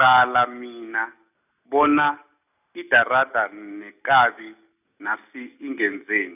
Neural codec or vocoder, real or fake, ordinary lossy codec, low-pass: none; real; none; 3.6 kHz